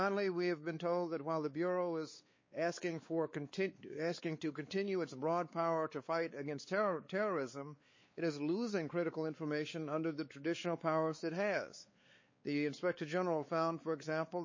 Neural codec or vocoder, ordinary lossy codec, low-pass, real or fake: codec, 16 kHz, 4 kbps, X-Codec, WavLM features, trained on Multilingual LibriSpeech; MP3, 32 kbps; 7.2 kHz; fake